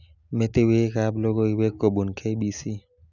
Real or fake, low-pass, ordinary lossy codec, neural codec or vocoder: real; 7.2 kHz; none; none